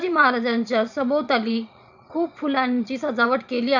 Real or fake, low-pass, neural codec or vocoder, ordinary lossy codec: fake; 7.2 kHz; vocoder, 22.05 kHz, 80 mel bands, Vocos; none